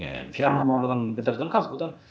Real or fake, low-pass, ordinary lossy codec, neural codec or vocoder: fake; none; none; codec, 16 kHz, 0.8 kbps, ZipCodec